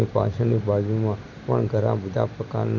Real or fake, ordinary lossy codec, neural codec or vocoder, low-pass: real; none; none; 7.2 kHz